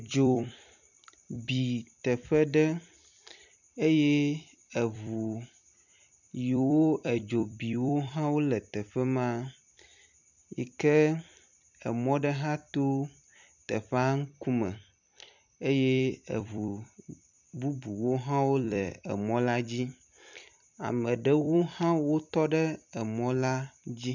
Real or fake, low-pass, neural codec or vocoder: fake; 7.2 kHz; vocoder, 44.1 kHz, 128 mel bands every 256 samples, BigVGAN v2